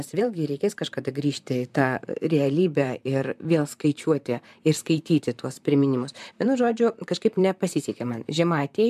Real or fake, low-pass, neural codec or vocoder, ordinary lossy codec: fake; 14.4 kHz; vocoder, 44.1 kHz, 128 mel bands, Pupu-Vocoder; MP3, 96 kbps